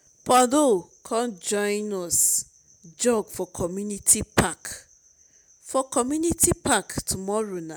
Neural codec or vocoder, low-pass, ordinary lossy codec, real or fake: none; none; none; real